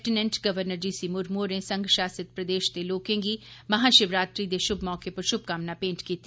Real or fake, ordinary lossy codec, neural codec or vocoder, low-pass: real; none; none; none